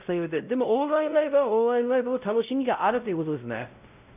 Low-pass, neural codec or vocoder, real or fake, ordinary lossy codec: 3.6 kHz; codec, 16 kHz, 0.5 kbps, X-Codec, WavLM features, trained on Multilingual LibriSpeech; fake; none